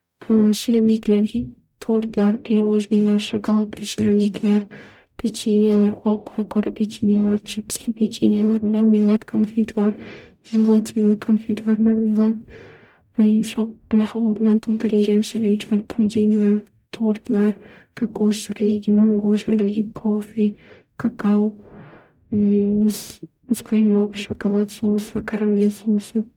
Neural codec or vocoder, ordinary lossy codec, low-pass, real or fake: codec, 44.1 kHz, 0.9 kbps, DAC; none; 19.8 kHz; fake